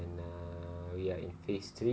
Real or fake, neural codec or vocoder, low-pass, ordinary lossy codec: real; none; none; none